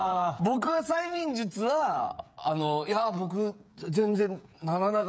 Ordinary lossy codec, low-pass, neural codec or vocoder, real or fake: none; none; codec, 16 kHz, 8 kbps, FreqCodec, smaller model; fake